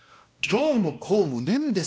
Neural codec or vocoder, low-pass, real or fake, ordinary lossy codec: codec, 16 kHz, 1 kbps, X-Codec, WavLM features, trained on Multilingual LibriSpeech; none; fake; none